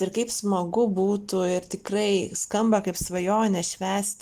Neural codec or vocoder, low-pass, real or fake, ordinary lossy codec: none; 14.4 kHz; real; Opus, 24 kbps